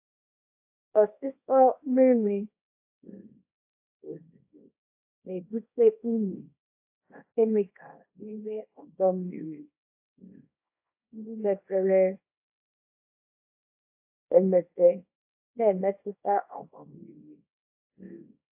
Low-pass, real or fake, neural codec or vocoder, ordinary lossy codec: 3.6 kHz; fake; codec, 24 kHz, 0.9 kbps, WavTokenizer, small release; AAC, 32 kbps